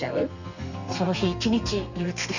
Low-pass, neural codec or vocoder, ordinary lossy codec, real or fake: 7.2 kHz; codec, 32 kHz, 1.9 kbps, SNAC; none; fake